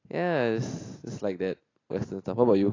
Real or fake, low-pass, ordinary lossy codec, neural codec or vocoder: real; 7.2 kHz; AAC, 32 kbps; none